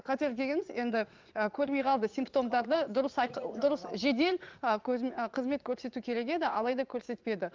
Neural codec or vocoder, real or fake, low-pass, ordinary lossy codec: vocoder, 44.1 kHz, 80 mel bands, Vocos; fake; 7.2 kHz; Opus, 24 kbps